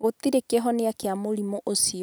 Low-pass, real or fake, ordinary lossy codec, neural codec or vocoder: none; real; none; none